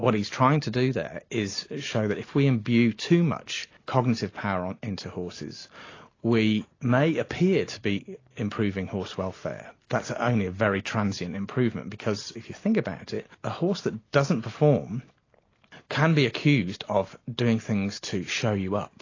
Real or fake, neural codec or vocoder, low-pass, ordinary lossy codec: real; none; 7.2 kHz; AAC, 32 kbps